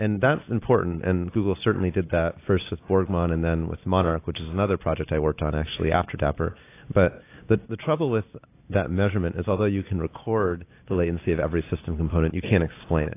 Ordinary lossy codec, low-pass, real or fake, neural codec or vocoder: AAC, 24 kbps; 3.6 kHz; real; none